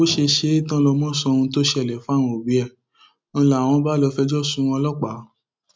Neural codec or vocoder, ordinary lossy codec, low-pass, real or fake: none; none; none; real